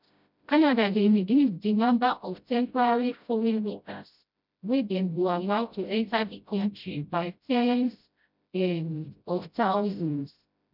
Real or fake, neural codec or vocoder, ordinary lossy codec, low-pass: fake; codec, 16 kHz, 0.5 kbps, FreqCodec, smaller model; none; 5.4 kHz